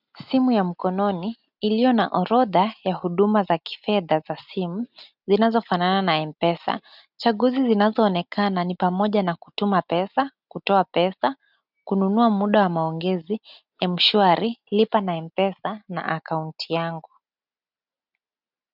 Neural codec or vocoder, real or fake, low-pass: none; real; 5.4 kHz